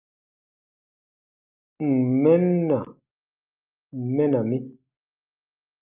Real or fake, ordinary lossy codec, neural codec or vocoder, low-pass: real; Opus, 24 kbps; none; 3.6 kHz